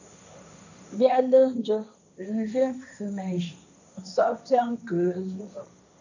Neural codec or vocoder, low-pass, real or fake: codec, 16 kHz, 1.1 kbps, Voila-Tokenizer; 7.2 kHz; fake